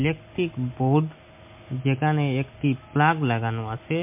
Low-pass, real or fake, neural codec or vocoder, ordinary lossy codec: 3.6 kHz; real; none; MP3, 32 kbps